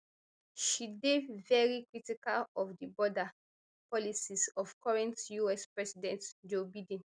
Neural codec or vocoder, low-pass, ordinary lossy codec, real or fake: none; 9.9 kHz; none; real